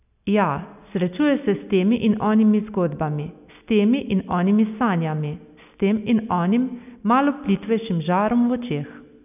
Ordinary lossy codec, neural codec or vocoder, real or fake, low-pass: none; none; real; 3.6 kHz